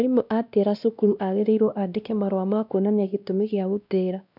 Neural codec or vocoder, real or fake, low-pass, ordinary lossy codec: codec, 16 kHz, 1 kbps, X-Codec, WavLM features, trained on Multilingual LibriSpeech; fake; 5.4 kHz; none